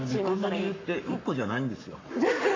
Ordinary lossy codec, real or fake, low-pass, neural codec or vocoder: MP3, 48 kbps; fake; 7.2 kHz; codec, 44.1 kHz, 7.8 kbps, Pupu-Codec